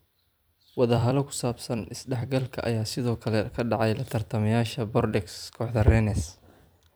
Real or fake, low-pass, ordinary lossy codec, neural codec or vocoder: fake; none; none; vocoder, 44.1 kHz, 128 mel bands every 256 samples, BigVGAN v2